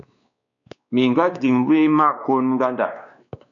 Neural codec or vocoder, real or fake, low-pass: codec, 16 kHz, 2 kbps, X-Codec, WavLM features, trained on Multilingual LibriSpeech; fake; 7.2 kHz